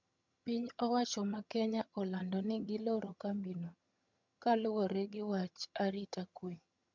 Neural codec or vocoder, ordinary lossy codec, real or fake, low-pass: vocoder, 22.05 kHz, 80 mel bands, HiFi-GAN; MP3, 64 kbps; fake; 7.2 kHz